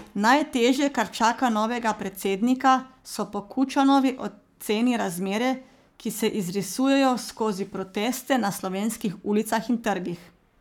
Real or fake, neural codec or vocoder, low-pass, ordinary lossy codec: fake; codec, 44.1 kHz, 7.8 kbps, Pupu-Codec; 19.8 kHz; none